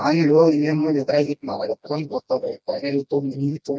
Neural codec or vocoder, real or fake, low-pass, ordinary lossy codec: codec, 16 kHz, 1 kbps, FreqCodec, smaller model; fake; none; none